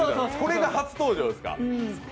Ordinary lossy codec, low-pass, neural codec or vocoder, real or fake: none; none; none; real